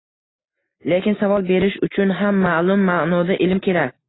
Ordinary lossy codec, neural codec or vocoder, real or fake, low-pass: AAC, 16 kbps; none; real; 7.2 kHz